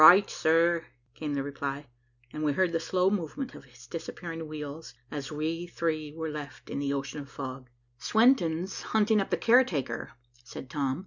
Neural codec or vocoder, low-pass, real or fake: none; 7.2 kHz; real